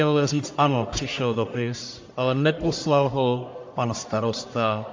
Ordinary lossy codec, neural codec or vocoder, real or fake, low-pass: MP3, 48 kbps; codec, 44.1 kHz, 1.7 kbps, Pupu-Codec; fake; 7.2 kHz